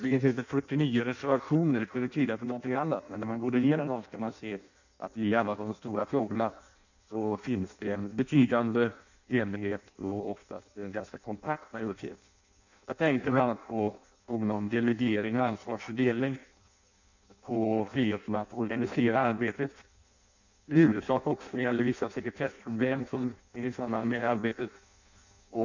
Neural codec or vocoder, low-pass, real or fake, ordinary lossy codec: codec, 16 kHz in and 24 kHz out, 0.6 kbps, FireRedTTS-2 codec; 7.2 kHz; fake; none